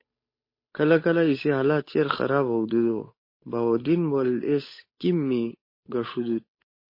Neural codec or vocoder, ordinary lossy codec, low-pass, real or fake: codec, 16 kHz, 8 kbps, FunCodec, trained on Chinese and English, 25 frames a second; MP3, 24 kbps; 5.4 kHz; fake